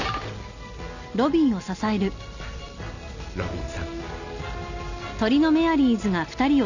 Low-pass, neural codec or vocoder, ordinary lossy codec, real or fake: 7.2 kHz; none; none; real